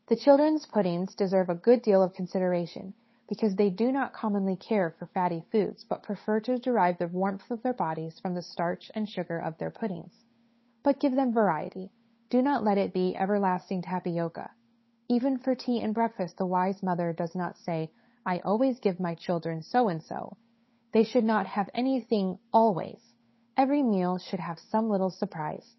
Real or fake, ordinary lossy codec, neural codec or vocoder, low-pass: fake; MP3, 24 kbps; codec, 16 kHz, 8 kbps, FunCodec, trained on Chinese and English, 25 frames a second; 7.2 kHz